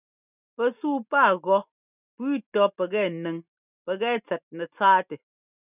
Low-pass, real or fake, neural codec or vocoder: 3.6 kHz; real; none